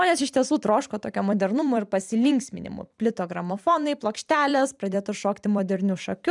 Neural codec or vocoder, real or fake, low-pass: vocoder, 44.1 kHz, 128 mel bands every 256 samples, BigVGAN v2; fake; 10.8 kHz